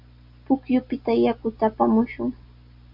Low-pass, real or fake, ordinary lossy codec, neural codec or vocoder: 5.4 kHz; real; MP3, 48 kbps; none